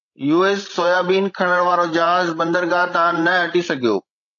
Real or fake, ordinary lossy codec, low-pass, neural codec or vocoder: fake; AAC, 48 kbps; 7.2 kHz; codec, 16 kHz, 16 kbps, FreqCodec, larger model